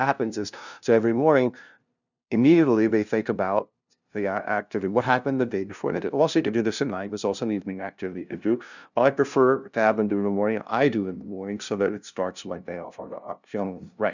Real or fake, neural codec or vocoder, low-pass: fake; codec, 16 kHz, 0.5 kbps, FunCodec, trained on LibriTTS, 25 frames a second; 7.2 kHz